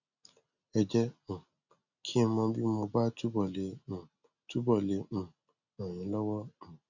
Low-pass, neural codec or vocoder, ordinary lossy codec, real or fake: 7.2 kHz; none; none; real